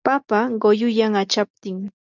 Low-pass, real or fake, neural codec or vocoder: 7.2 kHz; real; none